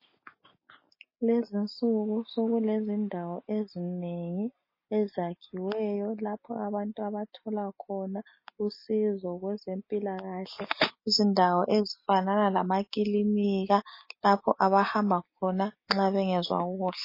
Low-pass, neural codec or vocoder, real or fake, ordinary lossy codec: 5.4 kHz; none; real; MP3, 24 kbps